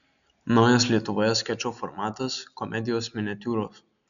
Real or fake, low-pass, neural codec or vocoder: real; 7.2 kHz; none